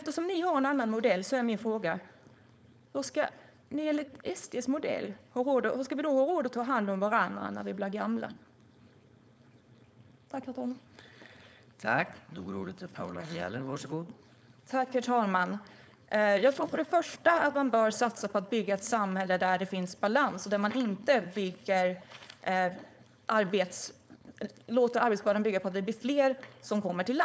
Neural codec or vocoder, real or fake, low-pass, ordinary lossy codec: codec, 16 kHz, 4.8 kbps, FACodec; fake; none; none